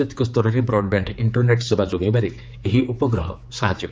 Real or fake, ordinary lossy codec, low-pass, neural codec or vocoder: fake; none; none; codec, 16 kHz, 4 kbps, X-Codec, HuBERT features, trained on general audio